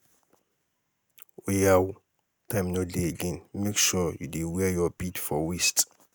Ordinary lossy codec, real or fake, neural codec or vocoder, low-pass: none; real; none; none